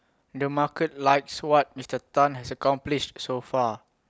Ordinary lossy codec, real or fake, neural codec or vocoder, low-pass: none; real; none; none